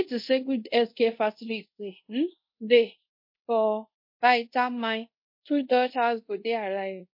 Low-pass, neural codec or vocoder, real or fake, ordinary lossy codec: 5.4 kHz; codec, 24 kHz, 0.5 kbps, DualCodec; fake; MP3, 32 kbps